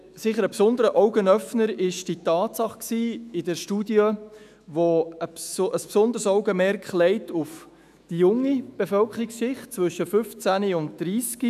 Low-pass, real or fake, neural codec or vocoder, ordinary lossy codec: 14.4 kHz; fake; autoencoder, 48 kHz, 128 numbers a frame, DAC-VAE, trained on Japanese speech; none